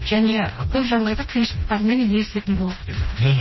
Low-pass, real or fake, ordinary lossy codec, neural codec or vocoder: 7.2 kHz; fake; MP3, 24 kbps; codec, 16 kHz, 1 kbps, FreqCodec, smaller model